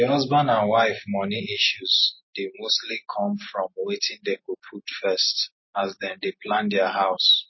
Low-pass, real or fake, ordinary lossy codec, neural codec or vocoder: 7.2 kHz; real; MP3, 24 kbps; none